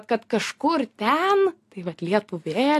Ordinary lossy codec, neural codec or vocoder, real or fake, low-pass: AAC, 64 kbps; none; real; 14.4 kHz